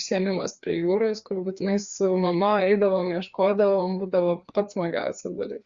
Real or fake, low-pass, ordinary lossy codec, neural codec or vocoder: fake; 7.2 kHz; Opus, 64 kbps; codec, 16 kHz, 2 kbps, FreqCodec, larger model